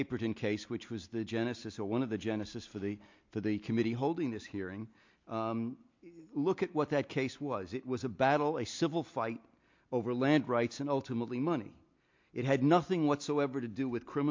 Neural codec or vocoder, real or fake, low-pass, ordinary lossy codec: none; real; 7.2 kHz; MP3, 48 kbps